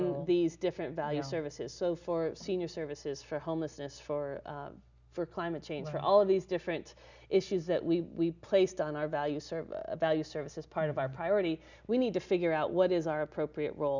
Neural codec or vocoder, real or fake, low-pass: none; real; 7.2 kHz